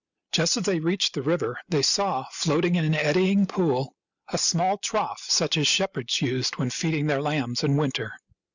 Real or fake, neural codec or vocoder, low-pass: real; none; 7.2 kHz